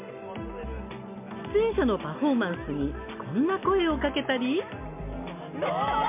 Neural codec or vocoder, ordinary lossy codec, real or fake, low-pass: none; MP3, 32 kbps; real; 3.6 kHz